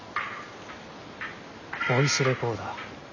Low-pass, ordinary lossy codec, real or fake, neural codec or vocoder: 7.2 kHz; none; real; none